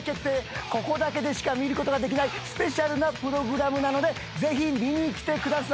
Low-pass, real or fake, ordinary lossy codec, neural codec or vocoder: none; real; none; none